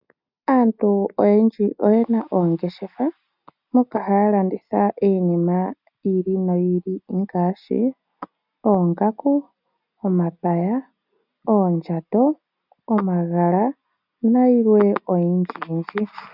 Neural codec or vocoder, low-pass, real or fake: none; 5.4 kHz; real